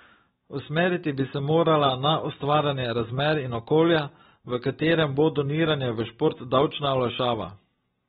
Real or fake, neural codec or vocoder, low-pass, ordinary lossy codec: real; none; 19.8 kHz; AAC, 16 kbps